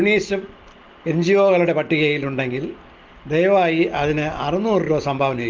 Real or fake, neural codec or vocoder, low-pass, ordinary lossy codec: real; none; 7.2 kHz; Opus, 32 kbps